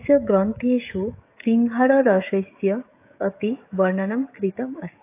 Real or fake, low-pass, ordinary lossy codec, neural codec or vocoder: fake; 3.6 kHz; AAC, 24 kbps; codec, 16 kHz, 16 kbps, FreqCodec, smaller model